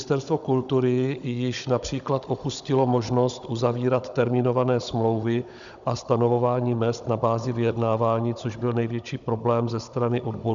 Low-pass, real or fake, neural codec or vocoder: 7.2 kHz; fake; codec, 16 kHz, 16 kbps, FunCodec, trained on Chinese and English, 50 frames a second